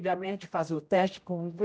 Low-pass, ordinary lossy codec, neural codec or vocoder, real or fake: none; none; codec, 16 kHz, 0.5 kbps, X-Codec, HuBERT features, trained on general audio; fake